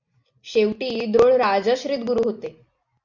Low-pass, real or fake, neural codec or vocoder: 7.2 kHz; real; none